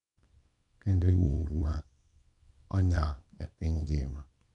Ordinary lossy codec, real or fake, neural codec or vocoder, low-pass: none; fake; codec, 24 kHz, 0.9 kbps, WavTokenizer, small release; 10.8 kHz